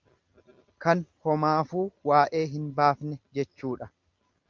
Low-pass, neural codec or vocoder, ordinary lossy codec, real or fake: 7.2 kHz; none; Opus, 32 kbps; real